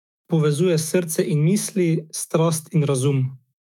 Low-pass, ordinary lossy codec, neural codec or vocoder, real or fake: 19.8 kHz; none; autoencoder, 48 kHz, 128 numbers a frame, DAC-VAE, trained on Japanese speech; fake